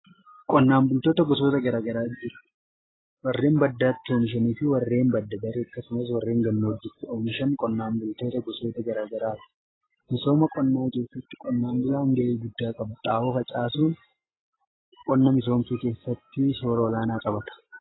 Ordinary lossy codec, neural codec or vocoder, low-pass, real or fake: AAC, 16 kbps; none; 7.2 kHz; real